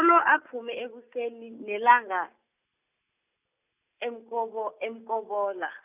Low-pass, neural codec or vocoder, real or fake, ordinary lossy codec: 3.6 kHz; none; real; none